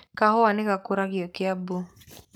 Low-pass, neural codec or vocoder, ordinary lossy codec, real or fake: none; codec, 44.1 kHz, 7.8 kbps, Pupu-Codec; none; fake